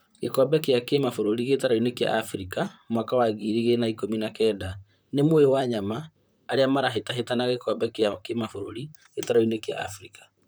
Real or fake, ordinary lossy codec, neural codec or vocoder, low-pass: fake; none; vocoder, 44.1 kHz, 128 mel bands, Pupu-Vocoder; none